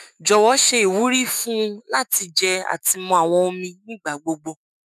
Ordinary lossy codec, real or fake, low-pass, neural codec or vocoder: none; fake; 14.4 kHz; autoencoder, 48 kHz, 128 numbers a frame, DAC-VAE, trained on Japanese speech